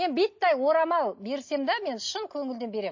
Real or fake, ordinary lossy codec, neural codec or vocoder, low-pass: real; MP3, 32 kbps; none; 7.2 kHz